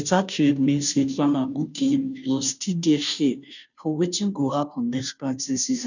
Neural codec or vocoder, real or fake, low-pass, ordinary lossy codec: codec, 16 kHz, 0.5 kbps, FunCodec, trained on Chinese and English, 25 frames a second; fake; 7.2 kHz; none